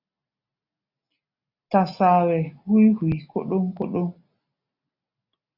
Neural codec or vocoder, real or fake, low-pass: none; real; 5.4 kHz